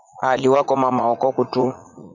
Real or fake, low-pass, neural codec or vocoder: fake; 7.2 kHz; vocoder, 44.1 kHz, 80 mel bands, Vocos